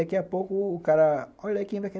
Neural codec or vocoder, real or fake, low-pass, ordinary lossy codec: none; real; none; none